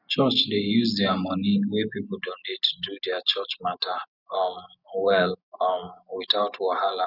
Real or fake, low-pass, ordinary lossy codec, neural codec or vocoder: real; 5.4 kHz; none; none